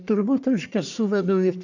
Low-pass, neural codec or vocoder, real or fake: 7.2 kHz; codec, 44.1 kHz, 1.7 kbps, Pupu-Codec; fake